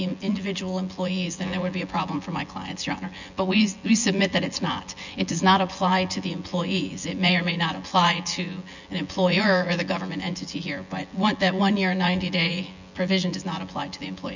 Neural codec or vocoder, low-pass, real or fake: vocoder, 24 kHz, 100 mel bands, Vocos; 7.2 kHz; fake